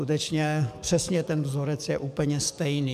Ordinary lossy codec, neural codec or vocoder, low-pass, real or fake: MP3, 96 kbps; codec, 44.1 kHz, 7.8 kbps, DAC; 14.4 kHz; fake